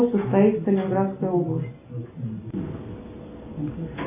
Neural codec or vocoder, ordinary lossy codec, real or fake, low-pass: none; AAC, 24 kbps; real; 3.6 kHz